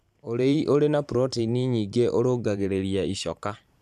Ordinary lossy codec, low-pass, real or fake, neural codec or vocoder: none; 14.4 kHz; fake; vocoder, 44.1 kHz, 128 mel bands every 512 samples, BigVGAN v2